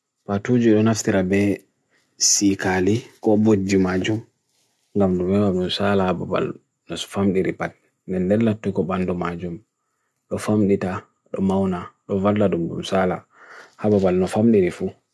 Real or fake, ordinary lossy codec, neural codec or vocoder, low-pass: real; none; none; none